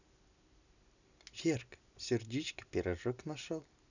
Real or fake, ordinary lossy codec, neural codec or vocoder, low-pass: real; none; none; 7.2 kHz